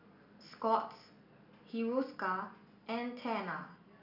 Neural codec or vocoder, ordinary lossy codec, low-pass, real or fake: none; none; 5.4 kHz; real